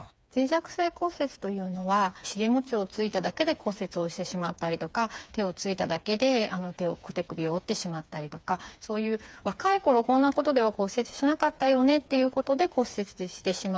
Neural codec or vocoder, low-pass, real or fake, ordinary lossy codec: codec, 16 kHz, 4 kbps, FreqCodec, smaller model; none; fake; none